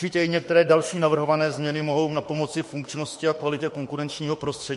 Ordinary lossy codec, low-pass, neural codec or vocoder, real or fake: MP3, 48 kbps; 14.4 kHz; autoencoder, 48 kHz, 32 numbers a frame, DAC-VAE, trained on Japanese speech; fake